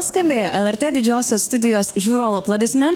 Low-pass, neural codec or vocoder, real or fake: 19.8 kHz; codec, 44.1 kHz, 2.6 kbps, DAC; fake